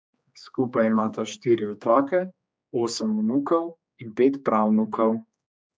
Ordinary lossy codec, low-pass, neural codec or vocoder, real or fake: none; none; codec, 16 kHz, 2 kbps, X-Codec, HuBERT features, trained on general audio; fake